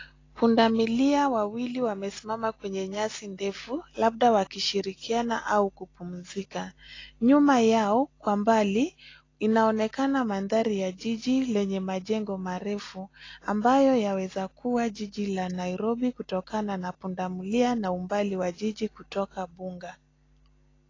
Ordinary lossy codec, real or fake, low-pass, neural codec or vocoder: AAC, 32 kbps; real; 7.2 kHz; none